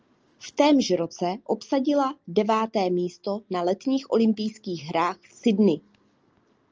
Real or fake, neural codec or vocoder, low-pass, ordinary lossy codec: real; none; 7.2 kHz; Opus, 24 kbps